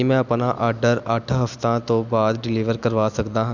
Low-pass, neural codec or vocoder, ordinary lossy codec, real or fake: 7.2 kHz; none; none; real